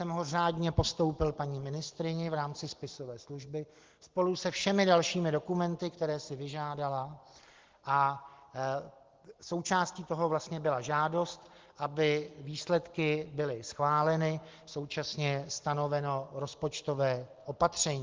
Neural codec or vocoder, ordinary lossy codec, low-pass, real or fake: none; Opus, 16 kbps; 7.2 kHz; real